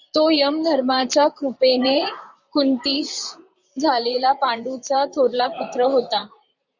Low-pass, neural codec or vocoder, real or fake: 7.2 kHz; vocoder, 44.1 kHz, 128 mel bands, Pupu-Vocoder; fake